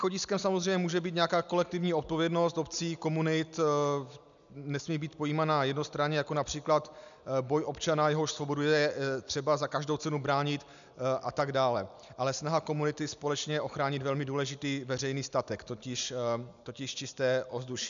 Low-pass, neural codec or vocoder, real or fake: 7.2 kHz; none; real